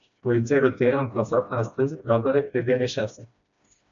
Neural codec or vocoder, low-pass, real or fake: codec, 16 kHz, 1 kbps, FreqCodec, smaller model; 7.2 kHz; fake